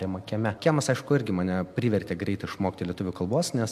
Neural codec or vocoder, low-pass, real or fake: none; 14.4 kHz; real